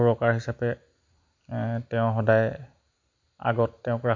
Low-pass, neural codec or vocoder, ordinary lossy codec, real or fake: 7.2 kHz; none; MP3, 48 kbps; real